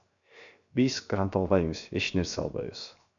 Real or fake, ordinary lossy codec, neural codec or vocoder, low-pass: fake; MP3, 96 kbps; codec, 16 kHz, 0.7 kbps, FocalCodec; 7.2 kHz